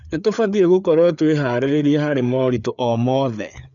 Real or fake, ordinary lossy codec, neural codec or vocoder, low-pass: fake; none; codec, 16 kHz, 4 kbps, FreqCodec, larger model; 7.2 kHz